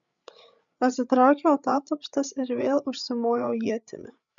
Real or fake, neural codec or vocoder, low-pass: fake; codec, 16 kHz, 8 kbps, FreqCodec, larger model; 7.2 kHz